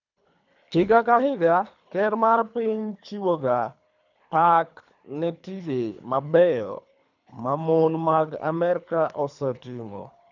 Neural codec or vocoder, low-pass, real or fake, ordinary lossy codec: codec, 24 kHz, 3 kbps, HILCodec; 7.2 kHz; fake; none